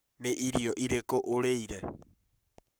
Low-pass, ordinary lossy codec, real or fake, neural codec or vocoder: none; none; fake; codec, 44.1 kHz, 7.8 kbps, Pupu-Codec